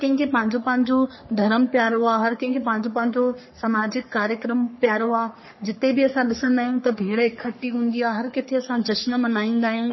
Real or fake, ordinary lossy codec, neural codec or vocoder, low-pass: fake; MP3, 24 kbps; codec, 44.1 kHz, 3.4 kbps, Pupu-Codec; 7.2 kHz